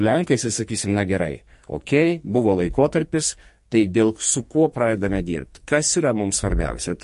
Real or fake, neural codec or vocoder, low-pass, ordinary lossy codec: fake; codec, 44.1 kHz, 2.6 kbps, SNAC; 14.4 kHz; MP3, 48 kbps